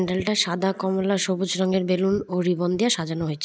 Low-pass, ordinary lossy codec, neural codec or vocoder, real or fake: none; none; none; real